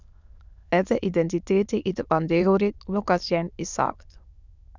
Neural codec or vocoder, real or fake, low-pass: autoencoder, 22.05 kHz, a latent of 192 numbers a frame, VITS, trained on many speakers; fake; 7.2 kHz